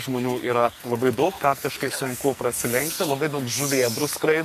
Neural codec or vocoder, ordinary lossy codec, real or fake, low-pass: codec, 44.1 kHz, 2.6 kbps, SNAC; MP3, 96 kbps; fake; 14.4 kHz